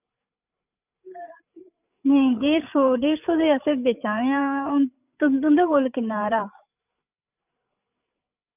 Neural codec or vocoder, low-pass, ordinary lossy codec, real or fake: codec, 16 kHz, 8 kbps, FreqCodec, larger model; 3.6 kHz; none; fake